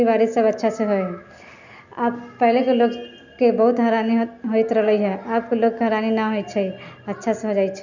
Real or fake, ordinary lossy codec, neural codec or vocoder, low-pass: real; none; none; 7.2 kHz